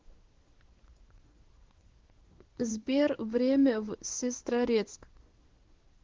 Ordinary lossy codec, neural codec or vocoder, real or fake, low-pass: Opus, 16 kbps; codec, 16 kHz in and 24 kHz out, 1 kbps, XY-Tokenizer; fake; 7.2 kHz